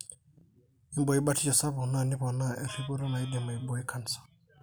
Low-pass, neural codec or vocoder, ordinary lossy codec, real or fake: none; none; none; real